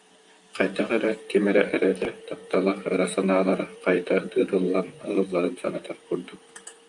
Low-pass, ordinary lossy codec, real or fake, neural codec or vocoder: 10.8 kHz; AAC, 64 kbps; fake; vocoder, 44.1 kHz, 128 mel bands, Pupu-Vocoder